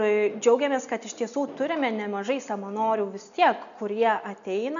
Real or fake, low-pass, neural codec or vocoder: real; 7.2 kHz; none